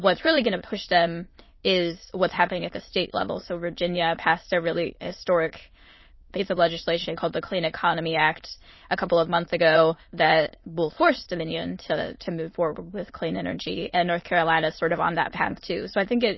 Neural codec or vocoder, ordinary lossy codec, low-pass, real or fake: autoencoder, 22.05 kHz, a latent of 192 numbers a frame, VITS, trained on many speakers; MP3, 24 kbps; 7.2 kHz; fake